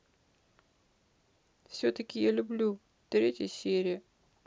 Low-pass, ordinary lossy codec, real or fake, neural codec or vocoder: none; none; real; none